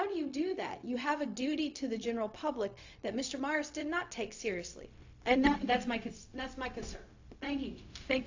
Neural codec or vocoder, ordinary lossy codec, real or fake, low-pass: codec, 16 kHz, 0.4 kbps, LongCat-Audio-Codec; AAC, 48 kbps; fake; 7.2 kHz